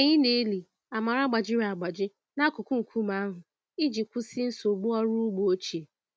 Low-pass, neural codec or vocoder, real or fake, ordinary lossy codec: none; none; real; none